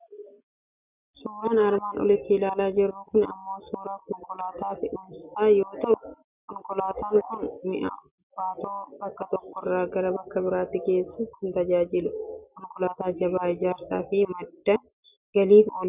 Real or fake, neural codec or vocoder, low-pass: real; none; 3.6 kHz